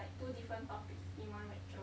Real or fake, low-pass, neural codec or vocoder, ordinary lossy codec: real; none; none; none